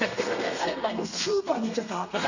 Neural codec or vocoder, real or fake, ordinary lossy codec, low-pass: codec, 32 kHz, 1.9 kbps, SNAC; fake; none; 7.2 kHz